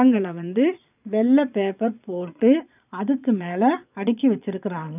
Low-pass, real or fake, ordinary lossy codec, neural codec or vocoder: 3.6 kHz; fake; none; vocoder, 22.05 kHz, 80 mel bands, Vocos